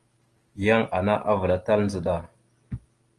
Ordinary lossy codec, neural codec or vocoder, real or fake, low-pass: Opus, 24 kbps; none; real; 10.8 kHz